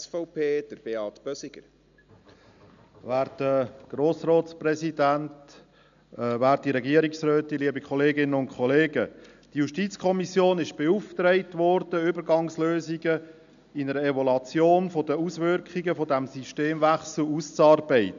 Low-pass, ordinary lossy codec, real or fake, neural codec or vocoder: 7.2 kHz; none; real; none